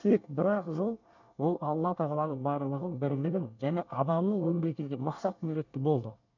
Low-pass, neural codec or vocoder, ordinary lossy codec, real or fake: 7.2 kHz; codec, 24 kHz, 1 kbps, SNAC; none; fake